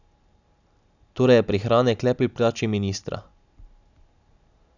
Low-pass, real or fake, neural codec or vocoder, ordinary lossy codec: 7.2 kHz; real; none; none